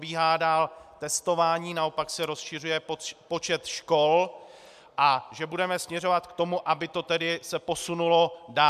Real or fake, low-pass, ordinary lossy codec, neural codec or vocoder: real; 14.4 kHz; MP3, 96 kbps; none